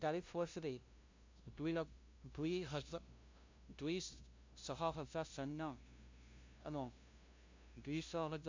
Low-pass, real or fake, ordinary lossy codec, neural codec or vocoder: 7.2 kHz; fake; MP3, 64 kbps; codec, 16 kHz, 0.5 kbps, FunCodec, trained on LibriTTS, 25 frames a second